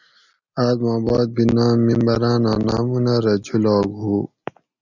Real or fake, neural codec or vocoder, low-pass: real; none; 7.2 kHz